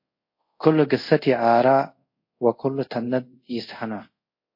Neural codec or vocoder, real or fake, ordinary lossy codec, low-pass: codec, 24 kHz, 0.5 kbps, DualCodec; fake; MP3, 32 kbps; 5.4 kHz